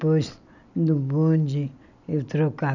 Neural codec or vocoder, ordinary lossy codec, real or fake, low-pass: none; none; real; 7.2 kHz